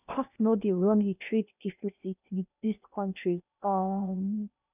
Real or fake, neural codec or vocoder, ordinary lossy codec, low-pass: fake; codec, 16 kHz in and 24 kHz out, 0.8 kbps, FocalCodec, streaming, 65536 codes; none; 3.6 kHz